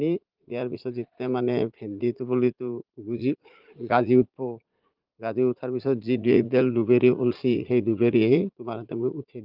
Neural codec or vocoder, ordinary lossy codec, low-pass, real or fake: vocoder, 44.1 kHz, 80 mel bands, Vocos; Opus, 32 kbps; 5.4 kHz; fake